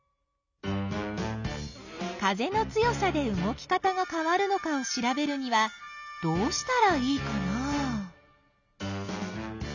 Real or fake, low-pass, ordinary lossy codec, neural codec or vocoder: real; 7.2 kHz; none; none